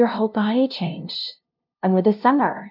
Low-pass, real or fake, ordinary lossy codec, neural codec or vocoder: 5.4 kHz; fake; AAC, 48 kbps; codec, 16 kHz, 0.5 kbps, FunCodec, trained on LibriTTS, 25 frames a second